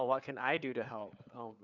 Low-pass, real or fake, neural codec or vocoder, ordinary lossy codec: 7.2 kHz; fake; codec, 16 kHz, 4 kbps, FunCodec, trained on LibriTTS, 50 frames a second; none